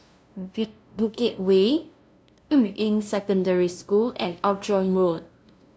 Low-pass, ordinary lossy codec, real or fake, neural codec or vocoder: none; none; fake; codec, 16 kHz, 0.5 kbps, FunCodec, trained on LibriTTS, 25 frames a second